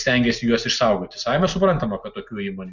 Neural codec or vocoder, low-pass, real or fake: none; 7.2 kHz; real